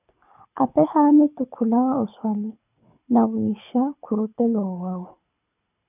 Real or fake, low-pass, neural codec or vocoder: fake; 3.6 kHz; codec, 24 kHz, 6 kbps, HILCodec